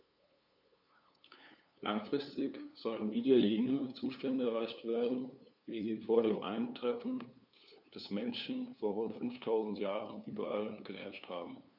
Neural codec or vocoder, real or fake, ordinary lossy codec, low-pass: codec, 16 kHz, 2 kbps, FunCodec, trained on LibriTTS, 25 frames a second; fake; none; 5.4 kHz